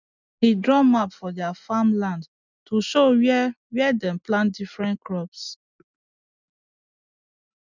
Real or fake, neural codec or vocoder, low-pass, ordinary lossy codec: real; none; 7.2 kHz; none